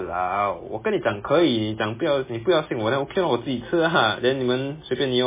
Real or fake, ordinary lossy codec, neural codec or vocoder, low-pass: real; MP3, 16 kbps; none; 3.6 kHz